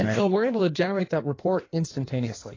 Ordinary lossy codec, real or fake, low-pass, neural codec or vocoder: AAC, 32 kbps; fake; 7.2 kHz; codec, 16 kHz in and 24 kHz out, 1.1 kbps, FireRedTTS-2 codec